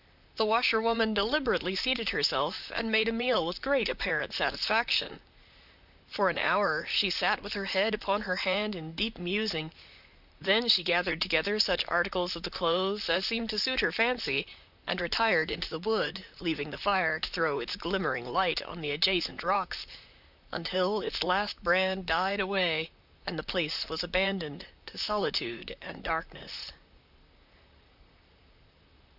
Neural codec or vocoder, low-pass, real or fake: vocoder, 44.1 kHz, 128 mel bands, Pupu-Vocoder; 5.4 kHz; fake